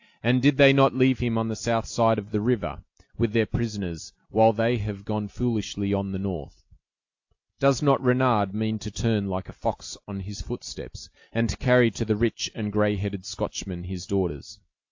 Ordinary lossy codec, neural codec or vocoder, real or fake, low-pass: AAC, 48 kbps; none; real; 7.2 kHz